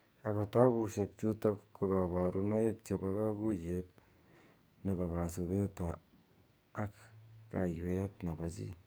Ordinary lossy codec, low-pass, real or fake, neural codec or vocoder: none; none; fake; codec, 44.1 kHz, 2.6 kbps, SNAC